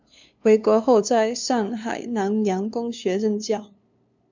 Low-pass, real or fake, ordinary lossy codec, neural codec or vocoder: 7.2 kHz; fake; MP3, 64 kbps; codec, 16 kHz, 2 kbps, FunCodec, trained on LibriTTS, 25 frames a second